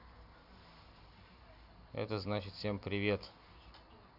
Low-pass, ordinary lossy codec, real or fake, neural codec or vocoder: 5.4 kHz; none; real; none